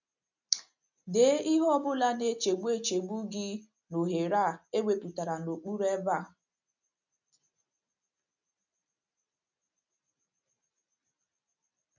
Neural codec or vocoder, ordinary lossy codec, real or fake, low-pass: none; none; real; 7.2 kHz